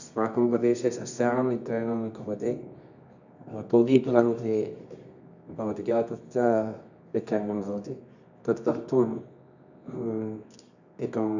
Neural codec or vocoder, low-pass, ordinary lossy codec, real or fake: codec, 24 kHz, 0.9 kbps, WavTokenizer, medium music audio release; 7.2 kHz; none; fake